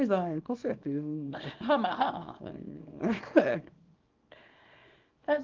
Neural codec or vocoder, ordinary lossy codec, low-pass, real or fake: codec, 24 kHz, 0.9 kbps, WavTokenizer, small release; Opus, 24 kbps; 7.2 kHz; fake